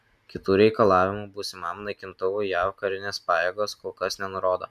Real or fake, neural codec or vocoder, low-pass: real; none; 14.4 kHz